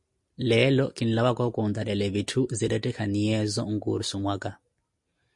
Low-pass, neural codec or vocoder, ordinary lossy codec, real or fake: 10.8 kHz; none; MP3, 48 kbps; real